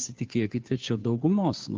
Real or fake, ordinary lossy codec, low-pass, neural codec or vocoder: fake; Opus, 32 kbps; 7.2 kHz; codec, 16 kHz, 2 kbps, FunCodec, trained on Chinese and English, 25 frames a second